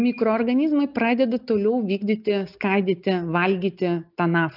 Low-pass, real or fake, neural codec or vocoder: 5.4 kHz; real; none